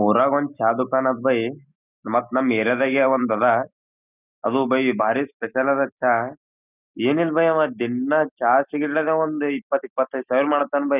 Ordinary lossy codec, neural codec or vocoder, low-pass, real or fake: none; none; 3.6 kHz; real